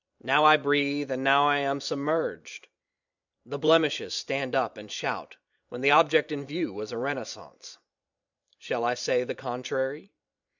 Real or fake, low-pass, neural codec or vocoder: fake; 7.2 kHz; vocoder, 44.1 kHz, 128 mel bands every 512 samples, BigVGAN v2